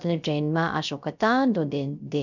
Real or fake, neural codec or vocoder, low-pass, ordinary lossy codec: fake; codec, 16 kHz, 0.3 kbps, FocalCodec; 7.2 kHz; none